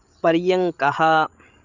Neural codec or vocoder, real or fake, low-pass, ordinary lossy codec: codec, 16 kHz, 16 kbps, FreqCodec, larger model; fake; 7.2 kHz; Opus, 64 kbps